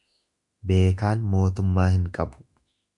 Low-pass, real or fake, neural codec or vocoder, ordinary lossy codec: 10.8 kHz; fake; autoencoder, 48 kHz, 32 numbers a frame, DAC-VAE, trained on Japanese speech; MP3, 96 kbps